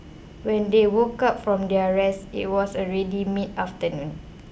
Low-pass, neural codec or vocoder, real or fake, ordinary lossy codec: none; none; real; none